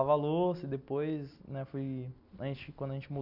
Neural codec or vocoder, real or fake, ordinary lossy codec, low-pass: none; real; none; 5.4 kHz